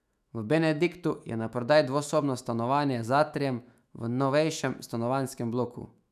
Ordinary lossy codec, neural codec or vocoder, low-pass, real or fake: none; autoencoder, 48 kHz, 128 numbers a frame, DAC-VAE, trained on Japanese speech; 14.4 kHz; fake